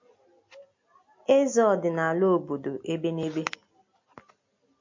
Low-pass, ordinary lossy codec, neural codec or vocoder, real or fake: 7.2 kHz; MP3, 48 kbps; none; real